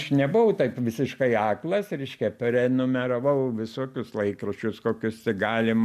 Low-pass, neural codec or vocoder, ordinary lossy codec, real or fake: 14.4 kHz; none; MP3, 96 kbps; real